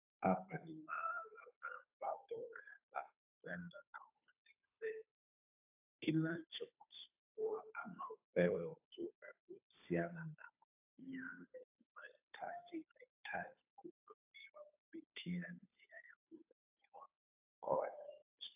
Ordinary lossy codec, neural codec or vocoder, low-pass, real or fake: AAC, 32 kbps; codec, 16 kHz, 2 kbps, FunCodec, trained on Chinese and English, 25 frames a second; 3.6 kHz; fake